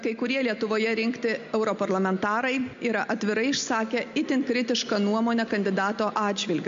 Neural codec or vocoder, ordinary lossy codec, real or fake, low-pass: none; MP3, 48 kbps; real; 7.2 kHz